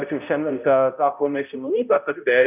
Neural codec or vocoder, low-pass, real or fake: codec, 16 kHz, 0.5 kbps, X-Codec, HuBERT features, trained on balanced general audio; 3.6 kHz; fake